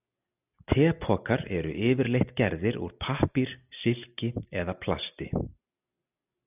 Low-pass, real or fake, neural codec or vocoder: 3.6 kHz; real; none